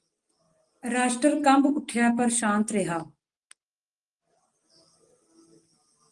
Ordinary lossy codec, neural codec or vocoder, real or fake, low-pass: Opus, 24 kbps; none; real; 10.8 kHz